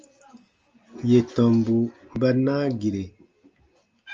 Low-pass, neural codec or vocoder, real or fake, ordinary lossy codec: 7.2 kHz; none; real; Opus, 24 kbps